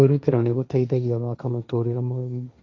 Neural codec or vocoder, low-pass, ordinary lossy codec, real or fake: codec, 16 kHz, 1.1 kbps, Voila-Tokenizer; none; none; fake